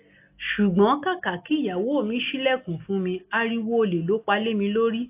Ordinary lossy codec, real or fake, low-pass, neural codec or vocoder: AAC, 24 kbps; real; 3.6 kHz; none